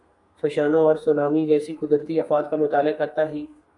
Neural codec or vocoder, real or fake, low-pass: codec, 32 kHz, 1.9 kbps, SNAC; fake; 10.8 kHz